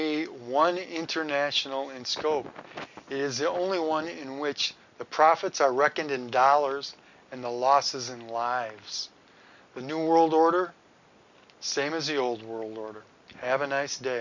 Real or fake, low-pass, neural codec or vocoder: real; 7.2 kHz; none